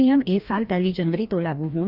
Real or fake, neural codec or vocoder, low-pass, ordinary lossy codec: fake; codec, 16 kHz, 1 kbps, FreqCodec, larger model; 5.4 kHz; Opus, 64 kbps